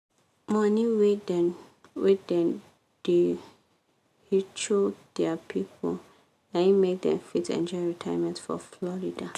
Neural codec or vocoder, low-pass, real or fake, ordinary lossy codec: none; 14.4 kHz; real; none